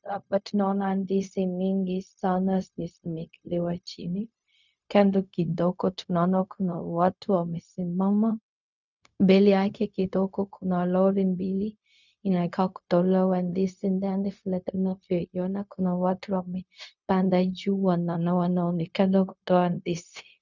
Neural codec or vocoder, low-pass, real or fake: codec, 16 kHz, 0.4 kbps, LongCat-Audio-Codec; 7.2 kHz; fake